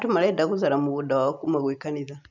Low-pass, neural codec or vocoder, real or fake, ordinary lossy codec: 7.2 kHz; none; real; none